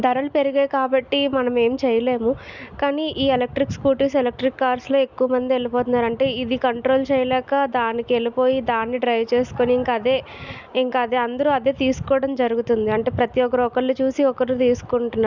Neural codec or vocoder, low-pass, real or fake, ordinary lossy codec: none; 7.2 kHz; real; none